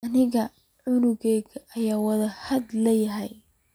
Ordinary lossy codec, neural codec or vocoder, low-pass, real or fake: none; none; none; real